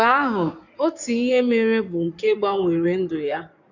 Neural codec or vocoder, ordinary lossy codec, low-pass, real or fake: codec, 16 kHz in and 24 kHz out, 2.2 kbps, FireRedTTS-2 codec; none; 7.2 kHz; fake